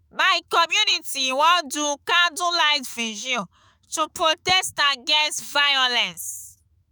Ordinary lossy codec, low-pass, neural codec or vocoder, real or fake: none; none; autoencoder, 48 kHz, 128 numbers a frame, DAC-VAE, trained on Japanese speech; fake